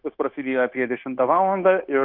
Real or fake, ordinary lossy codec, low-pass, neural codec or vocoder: fake; Opus, 24 kbps; 5.4 kHz; codec, 16 kHz, 0.9 kbps, LongCat-Audio-Codec